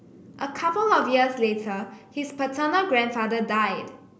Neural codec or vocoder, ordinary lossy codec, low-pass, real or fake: none; none; none; real